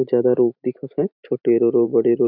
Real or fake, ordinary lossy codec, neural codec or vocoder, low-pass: fake; none; vocoder, 44.1 kHz, 128 mel bands every 512 samples, BigVGAN v2; 5.4 kHz